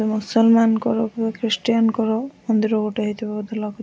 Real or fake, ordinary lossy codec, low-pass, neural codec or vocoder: real; none; none; none